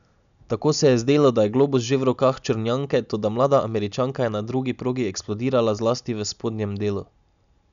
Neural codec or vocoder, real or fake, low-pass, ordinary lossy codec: none; real; 7.2 kHz; none